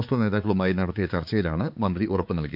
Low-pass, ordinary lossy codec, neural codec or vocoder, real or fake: 5.4 kHz; none; codec, 16 kHz, 4 kbps, X-Codec, HuBERT features, trained on balanced general audio; fake